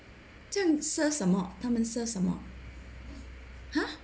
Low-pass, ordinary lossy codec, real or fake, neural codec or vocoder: none; none; real; none